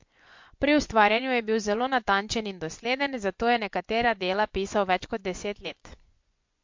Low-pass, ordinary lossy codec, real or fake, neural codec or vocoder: 7.2 kHz; MP3, 48 kbps; fake; vocoder, 24 kHz, 100 mel bands, Vocos